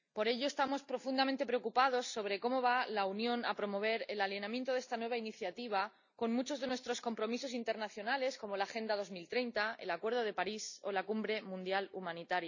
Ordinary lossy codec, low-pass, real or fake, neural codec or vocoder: none; 7.2 kHz; real; none